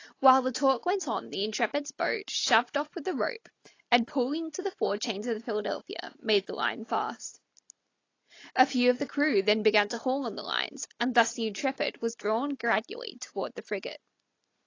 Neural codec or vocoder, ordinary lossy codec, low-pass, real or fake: none; AAC, 32 kbps; 7.2 kHz; real